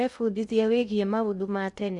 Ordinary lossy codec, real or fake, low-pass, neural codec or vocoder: none; fake; 10.8 kHz; codec, 16 kHz in and 24 kHz out, 0.6 kbps, FocalCodec, streaming, 2048 codes